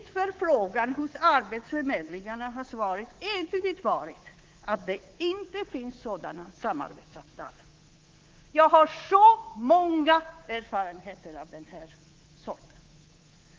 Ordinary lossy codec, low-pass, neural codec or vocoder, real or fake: Opus, 16 kbps; 7.2 kHz; codec, 24 kHz, 3.1 kbps, DualCodec; fake